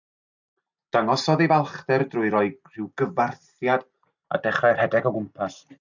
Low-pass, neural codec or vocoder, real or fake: 7.2 kHz; none; real